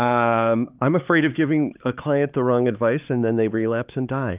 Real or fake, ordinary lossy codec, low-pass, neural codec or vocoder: fake; Opus, 32 kbps; 3.6 kHz; codec, 16 kHz, 4 kbps, X-Codec, HuBERT features, trained on LibriSpeech